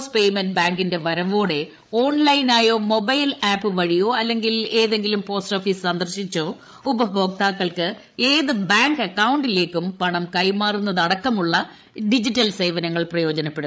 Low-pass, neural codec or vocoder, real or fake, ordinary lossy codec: none; codec, 16 kHz, 8 kbps, FreqCodec, larger model; fake; none